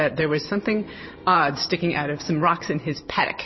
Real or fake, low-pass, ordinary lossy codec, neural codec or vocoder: fake; 7.2 kHz; MP3, 24 kbps; vocoder, 44.1 kHz, 128 mel bands every 512 samples, BigVGAN v2